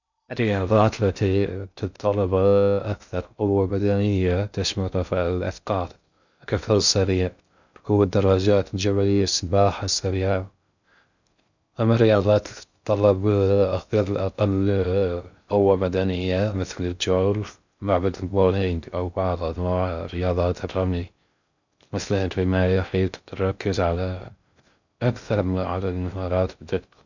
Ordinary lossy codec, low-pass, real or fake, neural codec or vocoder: none; 7.2 kHz; fake; codec, 16 kHz in and 24 kHz out, 0.6 kbps, FocalCodec, streaming, 2048 codes